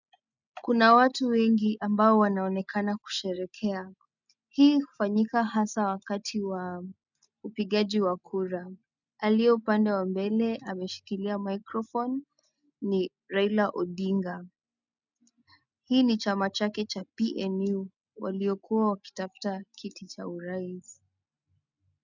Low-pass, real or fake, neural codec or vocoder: 7.2 kHz; real; none